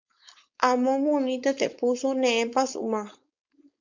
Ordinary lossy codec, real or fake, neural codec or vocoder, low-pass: MP3, 64 kbps; fake; codec, 16 kHz, 4.8 kbps, FACodec; 7.2 kHz